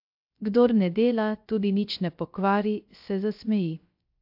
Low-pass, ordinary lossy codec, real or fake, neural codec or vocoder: 5.4 kHz; AAC, 48 kbps; fake; codec, 16 kHz, 0.3 kbps, FocalCodec